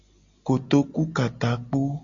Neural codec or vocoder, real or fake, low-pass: none; real; 7.2 kHz